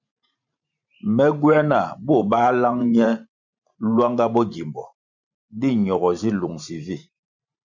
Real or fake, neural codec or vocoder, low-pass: fake; vocoder, 44.1 kHz, 128 mel bands every 512 samples, BigVGAN v2; 7.2 kHz